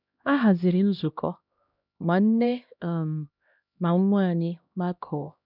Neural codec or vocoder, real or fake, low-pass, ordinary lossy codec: codec, 16 kHz, 1 kbps, X-Codec, HuBERT features, trained on LibriSpeech; fake; 5.4 kHz; none